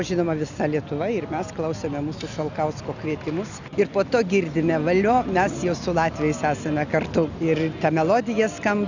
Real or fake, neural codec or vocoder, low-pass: real; none; 7.2 kHz